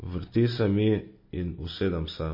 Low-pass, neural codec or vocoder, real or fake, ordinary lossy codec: 5.4 kHz; none; real; MP3, 24 kbps